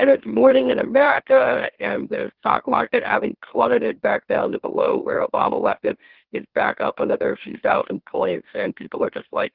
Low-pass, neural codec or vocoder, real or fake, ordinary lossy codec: 5.4 kHz; autoencoder, 44.1 kHz, a latent of 192 numbers a frame, MeloTTS; fake; Opus, 16 kbps